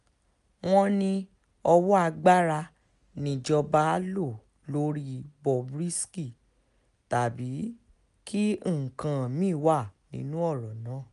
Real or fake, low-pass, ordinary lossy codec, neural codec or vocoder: real; 10.8 kHz; none; none